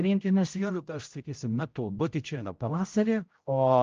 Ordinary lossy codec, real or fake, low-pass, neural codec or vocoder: Opus, 24 kbps; fake; 7.2 kHz; codec, 16 kHz, 0.5 kbps, X-Codec, HuBERT features, trained on general audio